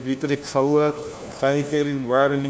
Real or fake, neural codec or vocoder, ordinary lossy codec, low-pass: fake; codec, 16 kHz, 1 kbps, FunCodec, trained on LibriTTS, 50 frames a second; none; none